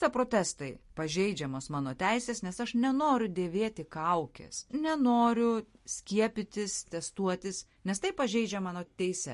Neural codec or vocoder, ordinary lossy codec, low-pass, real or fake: none; MP3, 48 kbps; 14.4 kHz; real